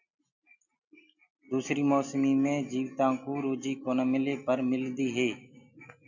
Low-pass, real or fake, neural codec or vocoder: 7.2 kHz; real; none